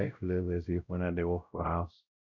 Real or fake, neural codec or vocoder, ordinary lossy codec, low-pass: fake; codec, 16 kHz, 0.5 kbps, X-Codec, WavLM features, trained on Multilingual LibriSpeech; none; 7.2 kHz